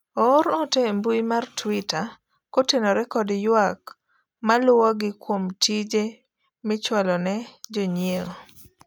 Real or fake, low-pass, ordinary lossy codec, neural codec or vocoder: real; none; none; none